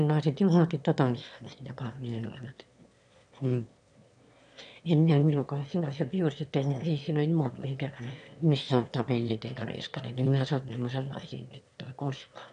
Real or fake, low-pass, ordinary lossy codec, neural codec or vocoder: fake; 9.9 kHz; none; autoencoder, 22.05 kHz, a latent of 192 numbers a frame, VITS, trained on one speaker